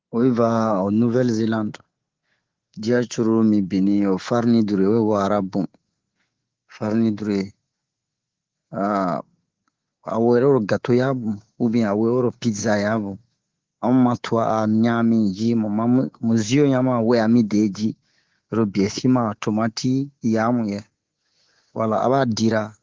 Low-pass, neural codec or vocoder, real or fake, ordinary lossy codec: 7.2 kHz; codec, 24 kHz, 3.1 kbps, DualCodec; fake; Opus, 16 kbps